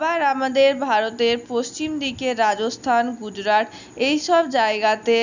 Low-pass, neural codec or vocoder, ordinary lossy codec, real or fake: 7.2 kHz; none; none; real